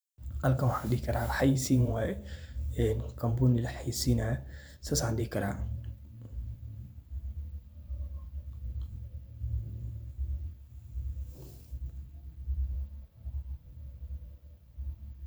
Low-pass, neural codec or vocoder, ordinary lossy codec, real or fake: none; vocoder, 44.1 kHz, 128 mel bands every 512 samples, BigVGAN v2; none; fake